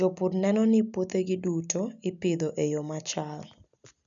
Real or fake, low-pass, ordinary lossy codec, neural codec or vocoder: real; 7.2 kHz; none; none